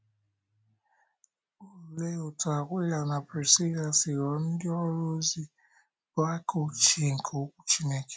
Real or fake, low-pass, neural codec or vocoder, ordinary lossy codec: real; none; none; none